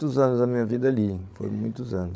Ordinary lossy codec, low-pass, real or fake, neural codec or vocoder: none; none; fake; codec, 16 kHz, 8 kbps, FreqCodec, larger model